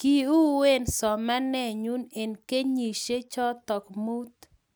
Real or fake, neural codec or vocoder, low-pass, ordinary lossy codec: real; none; none; none